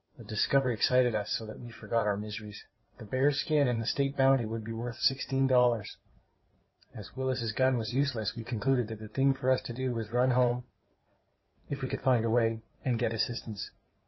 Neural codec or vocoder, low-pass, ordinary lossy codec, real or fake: codec, 16 kHz in and 24 kHz out, 2.2 kbps, FireRedTTS-2 codec; 7.2 kHz; MP3, 24 kbps; fake